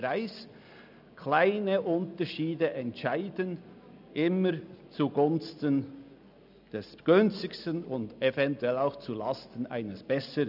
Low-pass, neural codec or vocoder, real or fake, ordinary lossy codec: 5.4 kHz; none; real; none